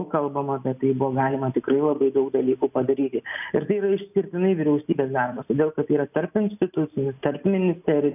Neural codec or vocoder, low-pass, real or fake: none; 3.6 kHz; real